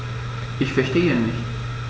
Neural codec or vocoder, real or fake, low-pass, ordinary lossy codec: none; real; none; none